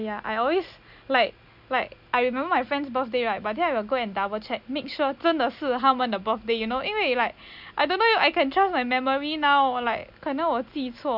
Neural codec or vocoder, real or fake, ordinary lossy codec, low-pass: none; real; none; 5.4 kHz